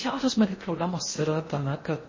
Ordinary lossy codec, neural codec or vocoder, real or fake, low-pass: MP3, 32 kbps; codec, 16 kHz in and 24 kHz out, 0.6 kbps, FocalCodec, streaming, 4096 codes; fake; 7.2 kHz